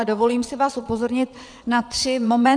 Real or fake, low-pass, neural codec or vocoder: fake; 9.9 kHz; vocoder, 22.05 kHz, 80 mel bands, WaveNeXt